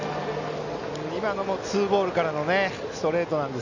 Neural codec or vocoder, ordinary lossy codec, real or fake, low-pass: none; none; real; 7.2 kHz